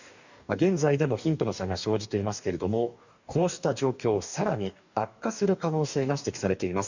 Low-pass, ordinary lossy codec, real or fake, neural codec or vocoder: 7.2 kHz; none; fake; codec, 44.1 kHz, 2.6 kbps, DAC